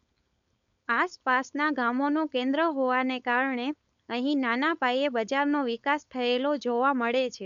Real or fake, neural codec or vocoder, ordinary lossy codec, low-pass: fake; codec, 16 kHz, 4.8 kbps, FACodec; none; 7.2 kHz